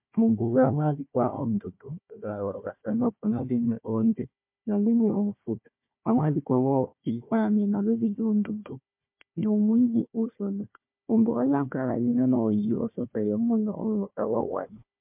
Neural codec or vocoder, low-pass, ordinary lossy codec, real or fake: codec, 16 kHz, 1 kbps, FunCodec, trained on Chinese and English, 50 frames a second; 3.6 kHz; MP3, 32 kbps; fake